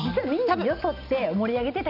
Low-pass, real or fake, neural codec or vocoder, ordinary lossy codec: 5.4 kHz; real; none; none